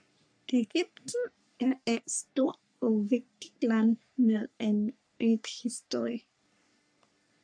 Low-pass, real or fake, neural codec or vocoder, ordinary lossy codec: 9.9 kHz; fake; codec, 44.1 kHz, 3.4 kbps, Pupu-Codec; AAC, 64 kbps